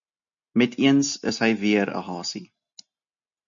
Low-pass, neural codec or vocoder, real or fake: 7.2 kHz; none; real